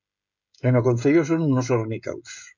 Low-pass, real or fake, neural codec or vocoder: 7.2 kHz; fake; codec, 16 kHz, 16 kbps, FreqCodec, smaller model